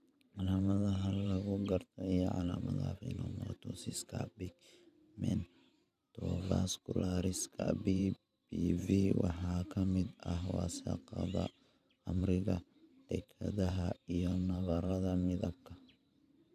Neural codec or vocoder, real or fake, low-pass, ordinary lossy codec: vocoder, 44.1 kHz, 128 mel bands every 512 samples, BigVGAN v2; fake; 14.4 kHz; none